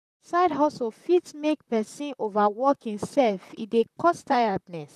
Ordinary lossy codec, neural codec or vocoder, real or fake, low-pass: none; vocoder, 44.1 kHz, 128 mel bands, Pupu-Vocoder; fake; 14.4 kHz